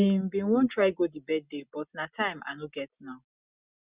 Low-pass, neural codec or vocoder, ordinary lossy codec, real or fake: 3.6 kHz; none; Opus, 64 kbps; real